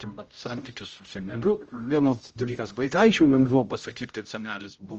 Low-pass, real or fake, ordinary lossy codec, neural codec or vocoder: 7.2 kHz; fake; Opus, 24 kbps; codec, 16 kHz, 0.5 kbps, X-Codec, HuBERT features, trained on general audio